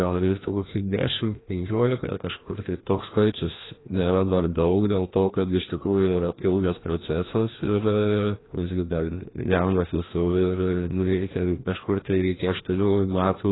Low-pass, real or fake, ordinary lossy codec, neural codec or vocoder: 7.2 kHz; fake; AAC, 16 kbps; codec, 16 kHz, 1 kbps, FreqCodec, larger model